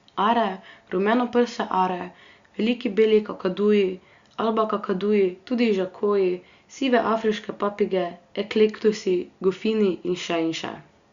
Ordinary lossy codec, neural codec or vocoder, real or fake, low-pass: Opus, 64 kbps; none; real; 7.2 kHz